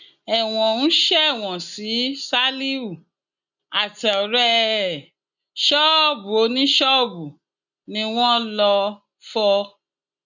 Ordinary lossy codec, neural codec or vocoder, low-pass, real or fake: none; none; 7.2 kHz; real